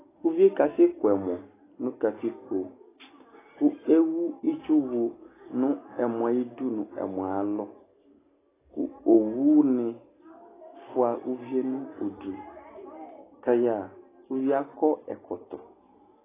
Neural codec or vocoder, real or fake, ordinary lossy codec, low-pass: none; real; AAC, 16 kbps; 3.6 kHz